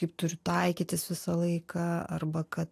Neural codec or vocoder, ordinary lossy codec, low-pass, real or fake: none; AAC, 64 kbps; 14.4 kHz; real